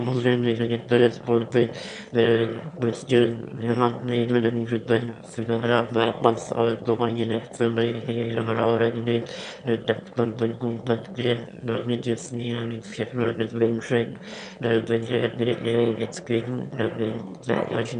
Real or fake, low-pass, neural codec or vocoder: fake; 9.9 kHz; autoencoder, 22.05 kHz, a latent of 192 numbers a frame, VITS, trained on one speaker